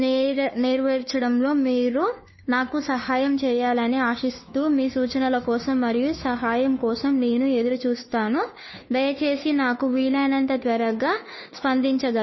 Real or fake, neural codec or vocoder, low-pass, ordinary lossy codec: fake; codec, 16 kHz, 2 kbps, FunCodec, trained on Chinese and English, 25 frames a second; 7.2 kHz; MP3, 24 kbps